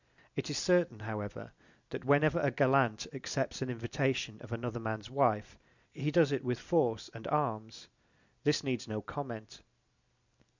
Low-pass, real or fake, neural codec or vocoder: 7.2 kHz; real; none